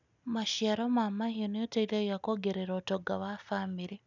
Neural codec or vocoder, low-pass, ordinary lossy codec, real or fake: none; 7.2 kHz; none; real